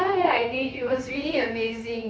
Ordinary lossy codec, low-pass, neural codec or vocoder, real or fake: none; none; codec, 16 kHz, 0.9 kbps, LongCat-Audio-Codec; fake